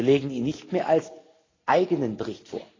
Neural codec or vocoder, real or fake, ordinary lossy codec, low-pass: none; real; AAC, 32 kbps; 7.2 kHz